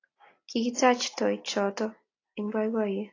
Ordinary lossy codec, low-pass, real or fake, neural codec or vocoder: AAC, 32 kbps; 7.2 kHz; real; none